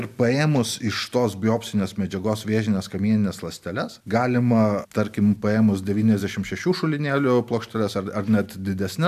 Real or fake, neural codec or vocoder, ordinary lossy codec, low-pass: real; none; MP3, 96 kbps; 14.4 kHz